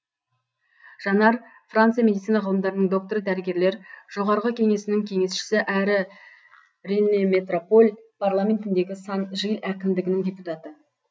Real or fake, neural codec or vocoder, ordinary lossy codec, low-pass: real; none; none; none